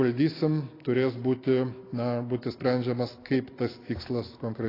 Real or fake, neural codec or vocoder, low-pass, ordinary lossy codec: real; none; 5.4 kHz; AAC, 24 kbps